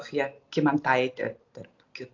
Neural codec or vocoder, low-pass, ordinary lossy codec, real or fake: none; 7.2 kHz; AAC, 48 kbps; real